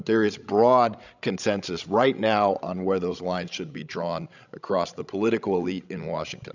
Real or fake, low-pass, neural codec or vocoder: fake; 7.2 kHz; codec, 16 kHz, 16 kbps, FreqCodec, larger model